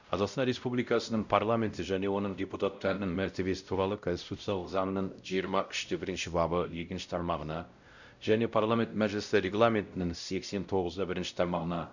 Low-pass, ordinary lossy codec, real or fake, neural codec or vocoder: 7.2 kHz; none; fake; codec, 16 kHz, 0.5 kbps, X-Codec, WavLM features, trained on Multilingual LibriSpeech